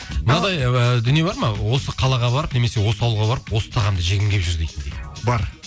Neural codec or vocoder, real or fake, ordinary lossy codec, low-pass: none; real; none; none